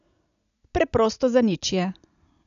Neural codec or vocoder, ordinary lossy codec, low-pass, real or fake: none; none; 7.2 kHz; real